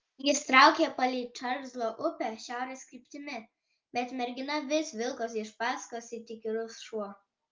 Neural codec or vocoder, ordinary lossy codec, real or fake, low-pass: none; Opus, 32 kbps; real; 7.2 kHz